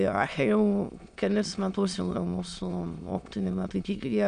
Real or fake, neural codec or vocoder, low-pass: fake; autoencoder, 22.05 kHz, a latent of 192 numbers a frame, VITS, trained on many speakers; 9.9 kHz